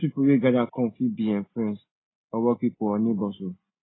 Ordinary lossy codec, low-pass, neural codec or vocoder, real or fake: AAC, 16 kbps; 7.2 kHz; none; real